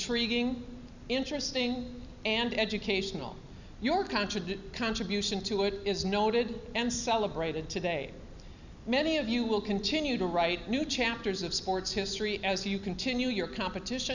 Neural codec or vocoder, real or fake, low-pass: none; real; 7.2 kHz